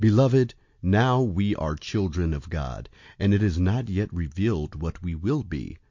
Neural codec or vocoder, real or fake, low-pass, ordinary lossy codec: none; real; 7.2 kHz; MP3, 48 kbps